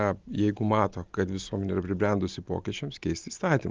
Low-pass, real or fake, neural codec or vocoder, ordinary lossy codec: 7.2 kHz; real; none; Opus, 24 kbps